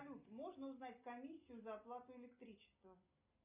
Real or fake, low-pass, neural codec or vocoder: real; 3.6 kHz; none